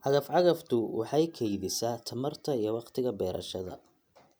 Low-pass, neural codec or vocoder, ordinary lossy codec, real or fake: none; none; none; real